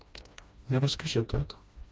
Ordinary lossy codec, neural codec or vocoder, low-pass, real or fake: none; codec, 16 kHz, 1 kbps, FreqCodec, smaller model; none; fake